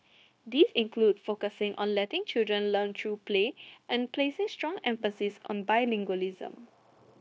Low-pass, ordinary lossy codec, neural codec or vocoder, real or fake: none; none; codec, 16 kHz, 0.9 kbps, LongCat-Audio-Codec; fake